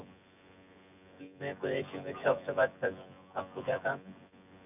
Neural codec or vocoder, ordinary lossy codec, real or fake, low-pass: vocoder, 24 kHz, 100 mel bands, Vocos; none; fake; 3.6 kHz